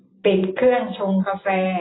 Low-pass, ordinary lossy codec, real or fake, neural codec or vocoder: 7.2 kHz; AAC, 16 kbps; real; none